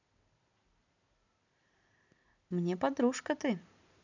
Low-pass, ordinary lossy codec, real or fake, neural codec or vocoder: 7.2 kHz; none; real; none